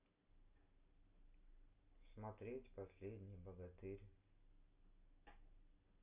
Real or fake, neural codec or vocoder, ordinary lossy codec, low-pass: real; none; none; 3.6 kHz